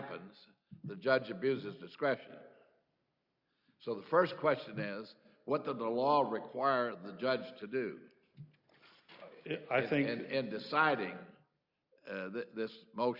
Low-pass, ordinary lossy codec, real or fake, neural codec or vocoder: 5.4 kHz; Opus, 64 kbps; real; none